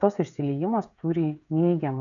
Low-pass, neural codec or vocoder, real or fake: 7.2 kHz; codec, 16 kHz, 16 kbps, FreqCodec, smaller model; fake